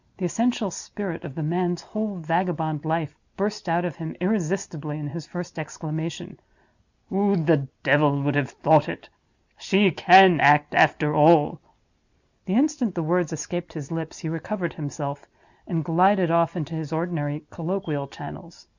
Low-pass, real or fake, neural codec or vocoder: 7.2 kHz; real; none